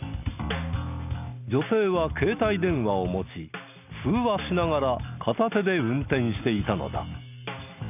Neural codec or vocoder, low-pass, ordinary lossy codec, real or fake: none; 3.6 kHz; none; real